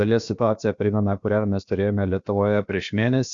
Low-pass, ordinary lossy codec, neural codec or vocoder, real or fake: 7.2 kHz; Opus, 64 kbps; codec, 16 kHz, about 1 kbps, DyCAST, with the encoder's durations; fake